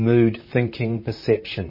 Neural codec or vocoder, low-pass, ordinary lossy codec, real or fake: vocoder, 44.1 kHz, 128 mel bands, Pupu-Vocoder; 5.4 kHz; MP3, 24 kbps; fake